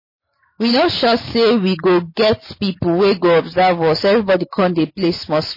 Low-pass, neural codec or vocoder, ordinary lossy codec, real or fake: 5.4 kHz; none; MP3, 24 kbps; real